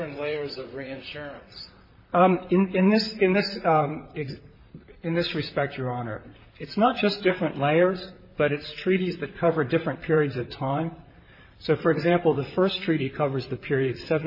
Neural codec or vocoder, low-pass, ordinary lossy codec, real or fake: vocoder, 44.1 kHz, 128 mel bands, Pupu-Vocoder; 5.4 kHz; MP3, 24 kbps; fake